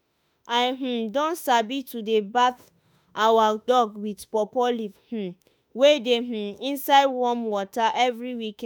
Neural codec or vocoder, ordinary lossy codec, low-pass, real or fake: autoencoder, 48 kHz, 32 numbers a frame, DAC-VAE, trained on Japanese speech; none; none; fake